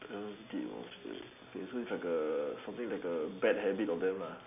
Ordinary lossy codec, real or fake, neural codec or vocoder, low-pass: none; real; none; 3.6 kHz